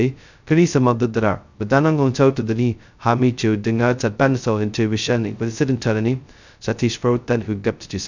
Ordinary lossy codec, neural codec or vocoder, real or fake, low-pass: none; codec, 16 kHz, 0.2 kbps, FocalCodec; fake; 7.2 kHz